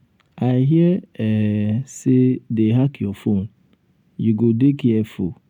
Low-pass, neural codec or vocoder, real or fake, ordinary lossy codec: 19.8 kHz; none; real; none